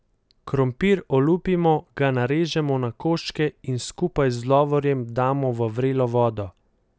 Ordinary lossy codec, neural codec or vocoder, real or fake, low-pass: none; none; real; none